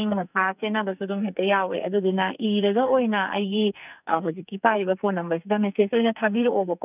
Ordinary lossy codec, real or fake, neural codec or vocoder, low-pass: none; fake; codec, 44.1 kHz, 2.6 kbps, SNAC; 3.6 kHz